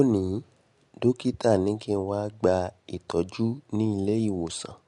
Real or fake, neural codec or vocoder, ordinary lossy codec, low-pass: real; none; MP3, 64 kbps; 10.8 kHz